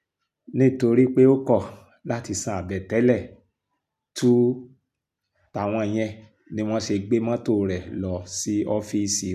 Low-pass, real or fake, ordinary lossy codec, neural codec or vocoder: 14.4 kHz; real; none; none